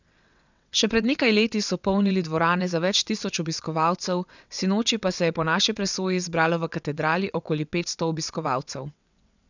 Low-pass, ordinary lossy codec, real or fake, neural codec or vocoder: 7.2 kHz; none; fake; vocoder, 22.05 kHz, 80 mel bands, WaveNeXt